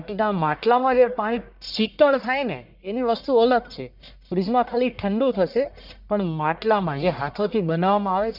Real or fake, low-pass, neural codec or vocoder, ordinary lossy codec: fake; 5.4 kHz; codec, 44.1 kHz, 1.7 kbps, Pupu-Codec; none